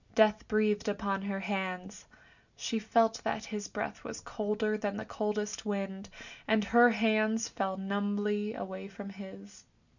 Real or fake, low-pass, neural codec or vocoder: real; 7.2 kHz; none